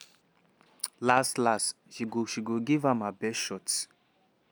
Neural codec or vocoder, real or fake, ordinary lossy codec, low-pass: none; real; none; none